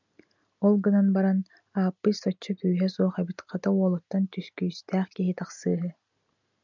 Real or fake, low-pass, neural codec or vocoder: real; 7.2 kHz; none